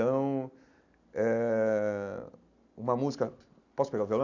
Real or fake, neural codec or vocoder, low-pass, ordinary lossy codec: real; none; 7.2 kHz; none